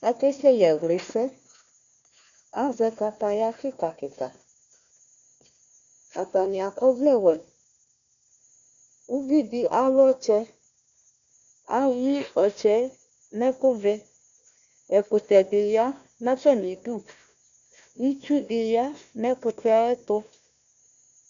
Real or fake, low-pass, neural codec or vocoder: fake; 7.2 kHz; codec, 16 kHz, 1 kbps, FunCodec, trained on Chinese and English, 50 frames a second